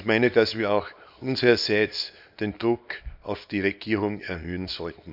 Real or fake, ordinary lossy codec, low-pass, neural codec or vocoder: fake; none; 5.4 kHz; codec, 24 kHz, 0.9 kbps, WavTokenizer, small release